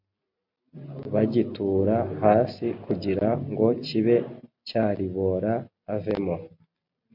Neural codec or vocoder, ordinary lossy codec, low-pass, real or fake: none; MP3, 48 kbps; 5.4 kHz; real